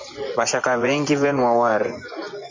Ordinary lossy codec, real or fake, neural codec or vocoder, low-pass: MP3, 48 kbps; fake; vocoder, 24 kHz, 100 mel bands, Vocos; 7.2 kHz